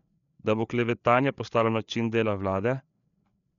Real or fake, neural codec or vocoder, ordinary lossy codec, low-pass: fake; codec, 16 kHz, 4 kbps, FreqCodec, larger model; none; 7.2 kHz